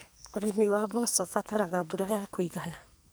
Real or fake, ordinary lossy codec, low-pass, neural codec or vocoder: fake; none; none; codec, 44.1 kHz, 2.6 kbps, SNAC